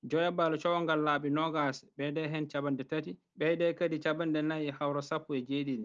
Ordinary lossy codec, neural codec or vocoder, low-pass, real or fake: Opus, 24 kbps; none; 7.2 kHz; real